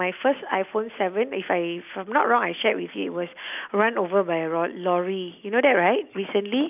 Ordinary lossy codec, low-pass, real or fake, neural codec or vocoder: none; 3.6 kHz; real; none